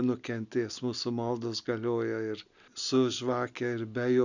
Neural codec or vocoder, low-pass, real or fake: none; 7.2 kHz; real